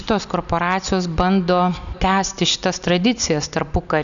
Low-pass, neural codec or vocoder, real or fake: 7.2 kHz; none; real